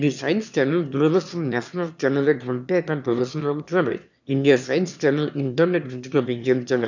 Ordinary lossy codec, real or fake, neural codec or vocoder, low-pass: none; fake; autoencoder, 22.05 kHz, a latent of 192 numbers a frame, VITS, trained on one speaker; 7.2 kHz